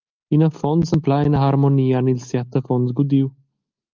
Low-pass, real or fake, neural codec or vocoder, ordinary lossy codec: 7.2 kHz; real; none; Opus, 32 kbps